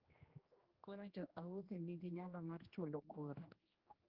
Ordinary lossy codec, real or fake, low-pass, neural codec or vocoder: Opus, 24 kbps; fake; 5.4 kHz; codec, 16 kHz, 1 kbps, X-Codec, HuBERT features, trained on general audio